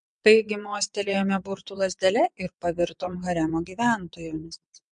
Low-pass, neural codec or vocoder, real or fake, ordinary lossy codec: 9.9 kHz; vocoder, 22.05 kHz, 80 mel bands, WaveNeXt; fake; MP3, 64 kbps